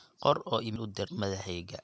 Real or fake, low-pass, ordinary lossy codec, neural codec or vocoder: real; none; none; none